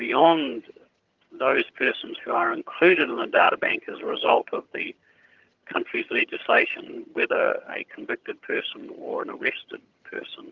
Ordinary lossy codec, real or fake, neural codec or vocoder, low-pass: Opus, 32 kbps; fake; vocoder, 22.05 kHz, 80 mel bands, HiFi-GAN; 7.2 kHz